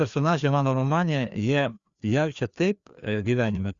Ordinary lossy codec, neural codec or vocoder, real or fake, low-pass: Opus, 64 kbps; codec, 16 kHz, 2 kbps, FreqCodec, larger model; fake; 7.2 kHz